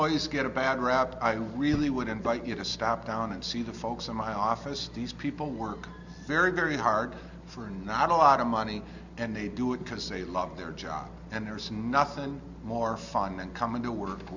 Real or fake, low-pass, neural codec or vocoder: real; 7.2 kHz; none